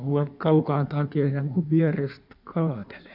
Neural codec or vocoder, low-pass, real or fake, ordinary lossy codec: codec, 16 kHz in and 24 kHz out, 1.1 kbps, FireRedTTS-2 codec; 5.4 kHz; fake; none